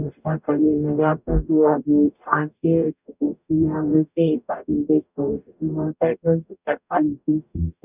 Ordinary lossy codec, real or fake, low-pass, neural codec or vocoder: none; fake; 3.6 kHz; codec, 44.1 kHz, 0.9 kbps, DAC